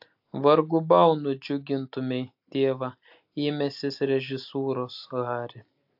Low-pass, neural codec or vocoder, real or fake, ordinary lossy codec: 5.4 kHz; none; real; AAC, 48 kbps